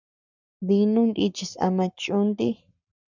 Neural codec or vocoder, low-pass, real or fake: codec, 44.1 kHz, 7.8 kbps, Pupu-Codec; 7.2 kHz; fake